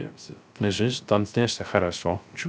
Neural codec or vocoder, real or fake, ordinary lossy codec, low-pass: codec, 16 kHz, 0.3 kbps, FocalCodec; fake; none; none